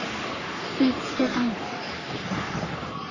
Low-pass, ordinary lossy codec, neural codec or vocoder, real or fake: 7.2 kHz; none; codec, 44.1 kHz, 3.4 kbps, Pupu-Codec; fake